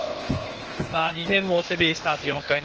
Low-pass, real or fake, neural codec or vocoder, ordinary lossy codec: 7.2 kHz; fake; codec, 16 kHz, 0.8 kbps, ZipCodec; Opus, 16 kbps